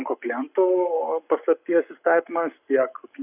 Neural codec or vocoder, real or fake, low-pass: codec, 44.1 kHz, 7.8 kbps, Pupu-Codec; fake; 3.6 kHz